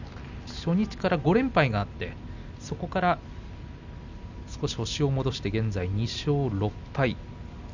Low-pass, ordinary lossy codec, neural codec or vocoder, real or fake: 7.2 kHz; none; none; real